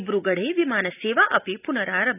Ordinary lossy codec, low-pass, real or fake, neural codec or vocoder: none; 3.6 kHz; real; none